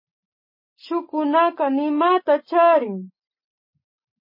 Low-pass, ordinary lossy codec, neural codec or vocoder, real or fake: 5.4 kHz; MP3, 24 kbps; none; real